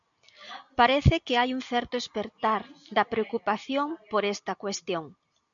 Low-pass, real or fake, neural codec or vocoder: 7.2 kHz; real; none